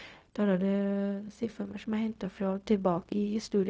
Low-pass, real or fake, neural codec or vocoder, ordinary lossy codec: none; fake; codec, 16 kHz, 0.4 kbps, LongCat-Audio-Codec; none